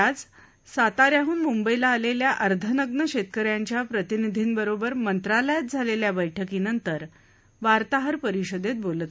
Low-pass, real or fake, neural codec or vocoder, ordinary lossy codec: none; real; none; none